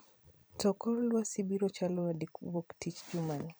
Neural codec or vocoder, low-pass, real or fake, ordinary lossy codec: vocoder, 44.1 kHz, 128 mel bands every 256 samples, BigVGAN v2; none; fake; none